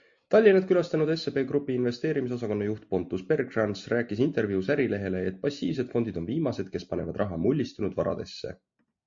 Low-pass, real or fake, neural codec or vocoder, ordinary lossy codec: 7.2 kHz; real; none; MP3, 48 kbps